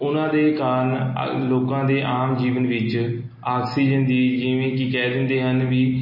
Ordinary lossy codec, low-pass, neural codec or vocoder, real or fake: MP3, 24 kbps; 5.4 kHz; none; real